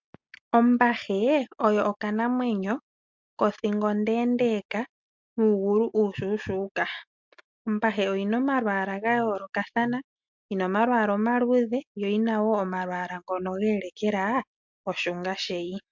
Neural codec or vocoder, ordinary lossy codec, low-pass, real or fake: none; MP3, 64 kbps; 7.2 kHz; real